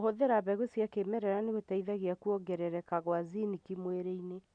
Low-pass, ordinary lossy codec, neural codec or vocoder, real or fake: 9.9 kHz; none; none; real